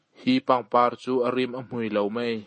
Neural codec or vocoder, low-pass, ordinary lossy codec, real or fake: none; 10.8 kHz; MP3, 32 kbps; real